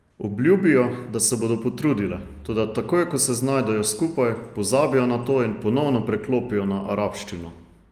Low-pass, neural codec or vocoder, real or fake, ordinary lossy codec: 14.4 kHz; none; real; Opus, 32 kbps